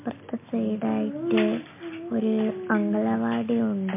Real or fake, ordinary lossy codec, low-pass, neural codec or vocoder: real; MP3, 24 kbps; 3.6 kHz; none